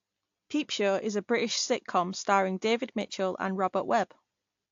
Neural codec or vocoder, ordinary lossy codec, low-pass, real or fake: none; AAC, 48 kbps; 7.2 kHz; real